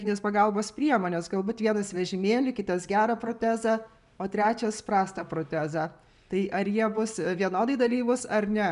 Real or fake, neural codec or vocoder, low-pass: real; none; 10.8 kHz